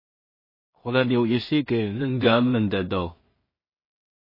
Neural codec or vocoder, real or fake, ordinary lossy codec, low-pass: codec, 16 kHz in and 24 kHz out, 0.4 kbps, LongCat-Audio-Codec, two codebook decoder; fake; MP3, 24 kbps; 5.4 kHz